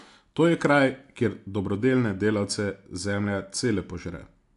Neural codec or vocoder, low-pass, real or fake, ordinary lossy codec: none; 10.8 kHz; real; AAC, 64 kbps